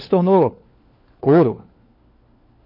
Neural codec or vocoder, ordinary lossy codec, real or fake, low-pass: codec, 16 kHz, 4 kbps, FunCodec, trained on LibriTTS, 50 frames a second; MP3, 32 kbps; fake; 5.4 kHz